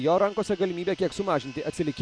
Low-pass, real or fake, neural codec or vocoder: 9.9 kHz; real; none